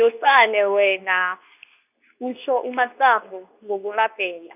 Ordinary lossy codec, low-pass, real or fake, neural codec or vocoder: none; 3.6 kHz; fake; codec, 24 kHz, 0.9 kbps, WavTokenizer, medium speech release version 2